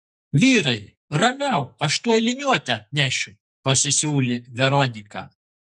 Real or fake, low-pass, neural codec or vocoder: fake; 10.8 kHz; codec, 44.1 kHz, 2.6 kbps, SNAC